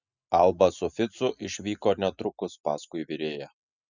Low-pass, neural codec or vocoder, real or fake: 7.2 kHz; none; real